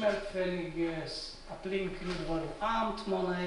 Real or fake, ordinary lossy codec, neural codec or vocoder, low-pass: real; MP3, 96 kbps; none; 10.8 kHz